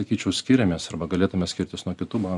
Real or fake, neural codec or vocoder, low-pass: real; none; 10.8 kHz